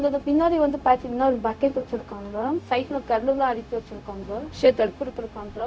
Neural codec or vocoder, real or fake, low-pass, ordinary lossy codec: codec, 16 kHz, 0.4 kbps, LongCat-Audio-Codec; fake; none; none